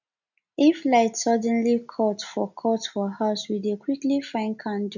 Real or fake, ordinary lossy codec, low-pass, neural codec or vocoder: real; none; 7.2 kHz; none